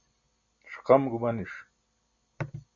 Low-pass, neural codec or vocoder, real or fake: 7.2 kHz; none; real